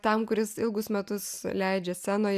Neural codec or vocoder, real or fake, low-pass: none; real; 14.4 kHz